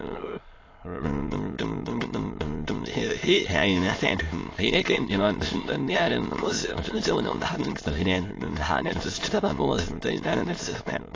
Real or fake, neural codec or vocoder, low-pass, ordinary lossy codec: fake; autoencoder, 22.05 kHz, a latent of 192 numbers a frame, VITS, trained on many speakers; 7.2 kHz; AAC, 32 kbps